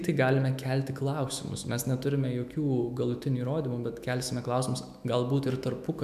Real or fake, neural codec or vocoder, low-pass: fake; autoencoder, 48 kHz, 128 numbers a frame, DAC-VAE, trained on Japanese speech; 14.4 kHz